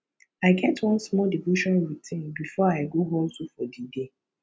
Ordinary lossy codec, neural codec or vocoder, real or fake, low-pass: none; none; real; none